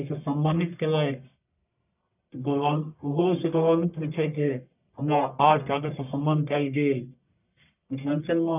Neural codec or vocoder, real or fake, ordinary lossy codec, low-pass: codec, 44.1 kHz, 1.7 kbps, Pupu-Codec; fake; none; 3.6 kHz